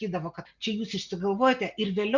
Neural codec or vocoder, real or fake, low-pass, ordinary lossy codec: none; real; 7.2 kHz; Opus, 64 kbps